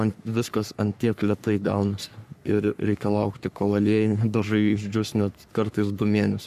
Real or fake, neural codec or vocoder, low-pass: fake; codec, 44.1 kHz, 3.4 kbps, Pupu-Codec; 14.4 kHz